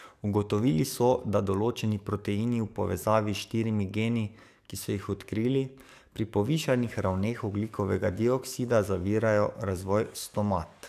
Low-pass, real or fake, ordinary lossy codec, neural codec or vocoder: 14.4 kHz; fake; none; codec, 44.1 kHz, 7.8 kbps, DAC